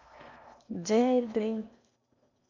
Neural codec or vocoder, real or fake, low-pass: codec, 16 kHz in and 24 kHz out, 0.8 kbps, FocalCodec, streaming, 65536 codes; fake; 7.2 kHz